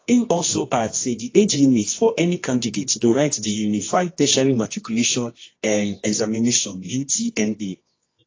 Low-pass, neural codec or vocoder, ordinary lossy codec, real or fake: 7.2 kHz; codec, 24 kHz, 0.9 kbps, WavTokenizer, medium music audio release; AAC, 32 kbps; fake